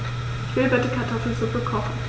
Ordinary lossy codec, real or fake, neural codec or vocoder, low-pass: none; real; none; none